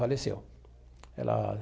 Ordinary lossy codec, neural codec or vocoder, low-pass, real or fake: none; none; none; real